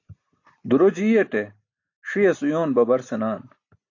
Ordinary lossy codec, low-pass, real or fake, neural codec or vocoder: AAC, 48 kbps; 7.2 kHz; real; none